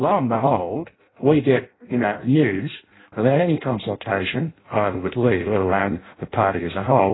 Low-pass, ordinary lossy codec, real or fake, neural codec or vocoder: 7.2 kHz; AAC, 16 kbps; fake; codec, 16 kHz in and 24 kHz out, 0.6 kbps, FireRedTTS-2 codec